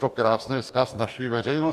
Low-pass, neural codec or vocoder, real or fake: 14.4 kHz; codec, 44.1 kHz, 2.6 kbps, DAC; fake